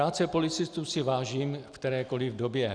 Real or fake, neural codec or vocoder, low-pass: real; none; 9.9 kHz